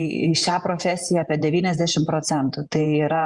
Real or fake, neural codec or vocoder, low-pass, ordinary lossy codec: fake; vocoder, 48 kHz, 128 mel bands, Vocos; 10.8 kHz; Opus, 64 kbps